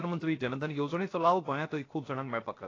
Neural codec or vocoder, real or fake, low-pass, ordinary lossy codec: codec, 16 kHz, 0.7 kbps, FocalCodec; fake; 7.2 kHz; AAC, 32 kbps